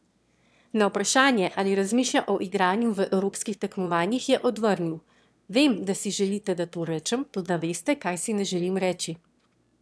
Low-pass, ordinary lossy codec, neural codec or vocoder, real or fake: none; none; autoencoder, 22.05 kHz, a latent of 192 numbers a frame, VITS, trained on one speaker; fake